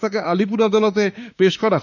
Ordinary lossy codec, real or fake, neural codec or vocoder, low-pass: none; fake; autoencoder, 48 kHz, 32 numbers a frame, DAC-VAE, trained on Japanese speech; 7.2 kHz